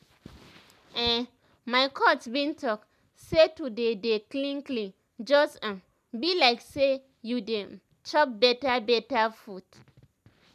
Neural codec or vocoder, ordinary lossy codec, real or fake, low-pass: none; none; real; 14.4 kHz